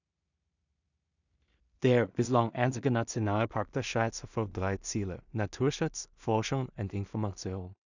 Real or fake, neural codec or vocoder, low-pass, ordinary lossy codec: fake; codec, 16 kHz in and 24 kHz out, 0.4 kbps, LongCat-Audio-Codec, two codebook decoder; 7.2 kHz; none